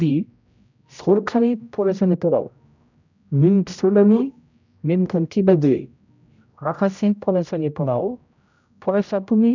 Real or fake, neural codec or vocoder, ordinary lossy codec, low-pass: fake; codec, 16 kHz, 0.5 kbps, X-Codec, HuBERT features, trained on general audio; none; 7.2 kHz